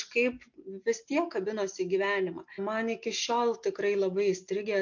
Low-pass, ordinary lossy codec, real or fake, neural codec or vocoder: 7.2 kHz; MP3, 48 kbps; real; none